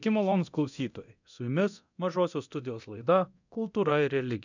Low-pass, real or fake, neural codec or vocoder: 7.2 kHz; fake; codec, 24 kHz, 0.9 kbps, DualCodec